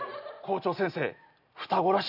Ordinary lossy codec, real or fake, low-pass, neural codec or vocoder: none; real; 5.4 kHz; none